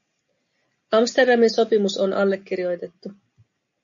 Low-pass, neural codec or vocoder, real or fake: 7.2 kHz; none; real